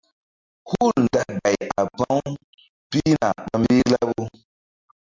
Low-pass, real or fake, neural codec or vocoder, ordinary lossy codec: 7.2 kHz; real; none; MP3, 64 kbps